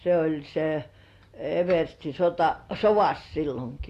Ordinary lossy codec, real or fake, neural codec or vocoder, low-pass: AAC, 48 kbps; real; none; 14.4 kHz